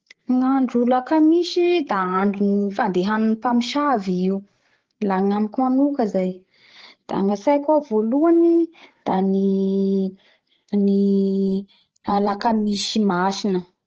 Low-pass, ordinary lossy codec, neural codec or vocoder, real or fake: 7.2 kHz; Opus, 16 kbps; codec, 16 kHz, 8 kbps, FreqCodec, larger model; fake